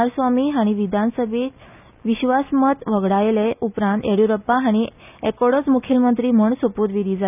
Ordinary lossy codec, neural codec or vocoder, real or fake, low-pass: none; none; real; 3.6 kHz